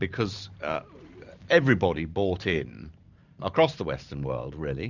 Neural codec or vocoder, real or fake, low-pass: vocoder, 44.1 kHz, 128 mel bands every 512 samples, BigVGAN v2; fake; 7.2 kHz